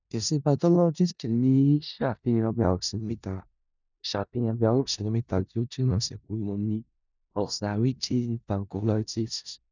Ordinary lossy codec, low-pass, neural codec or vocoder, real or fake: none; 7.2 kHz; codec, 16 kHz in and 24 kHz out, 0.4 kbps, LongCat-Audio-Codec, four codebook decoder; fake